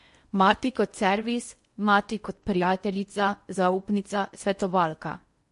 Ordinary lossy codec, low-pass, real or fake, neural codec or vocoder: MP3, 48 kbps; 10.8 kHz; fake; codec, 16 kHz in and 24 kHz out, 0.8 kbps, FocalCodec, streaming, 65536 codes